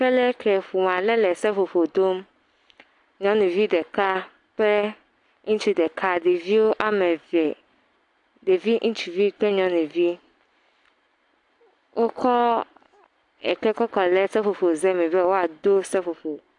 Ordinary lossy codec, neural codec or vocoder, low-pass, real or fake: AAC, 64 kbps; none; 10.8 kHz; real